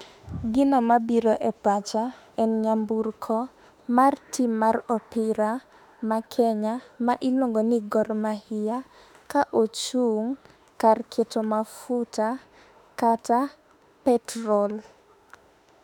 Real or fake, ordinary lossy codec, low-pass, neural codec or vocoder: fake; none; 19.8 kHz; autoencoder, 48 kHz, 32 numbers a frame, DAC-VAE, trained on Japanese speech